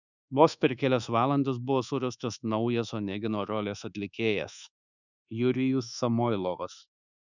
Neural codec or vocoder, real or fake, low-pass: codec, 24 kHz, 1.2 kbps, DualCodec; fake; 7.2 kHz